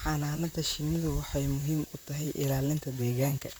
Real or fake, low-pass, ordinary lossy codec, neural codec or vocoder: fake; none; none; vocoder, 44.1 kHz, 128 mel bands, Pupu-Vocoder